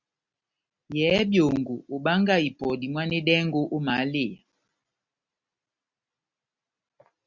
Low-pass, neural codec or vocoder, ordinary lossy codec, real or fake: 7.2 kHz; none; Opus, 64 kbps; real